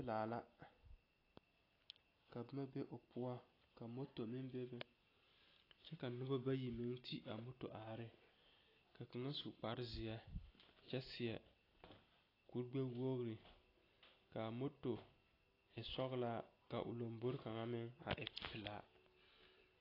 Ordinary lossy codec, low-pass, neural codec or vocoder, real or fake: AAC, 24 kbps; 5.4 kHz; none; real